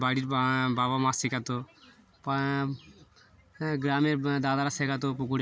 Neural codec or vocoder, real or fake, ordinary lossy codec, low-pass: none; real; none; none